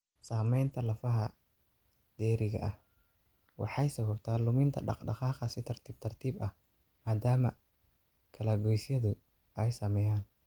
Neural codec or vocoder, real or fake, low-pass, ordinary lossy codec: none; real; 19.8 kHz; Opus, 24 kbps